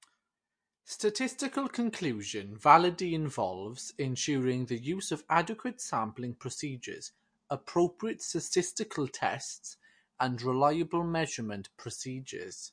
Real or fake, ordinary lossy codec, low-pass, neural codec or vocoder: real; MP3, 48 kbps; 9.9 kHz; none